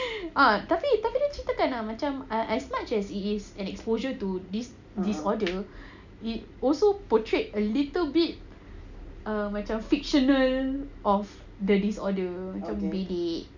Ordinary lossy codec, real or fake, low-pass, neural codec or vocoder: none; real; 7.2 kHz; none